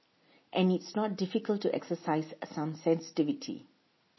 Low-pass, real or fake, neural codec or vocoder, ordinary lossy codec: 7.2 kHz; real; none; MP3, 24 kbps